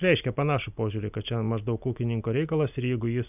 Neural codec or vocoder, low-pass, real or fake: none; 3.6 kHz; real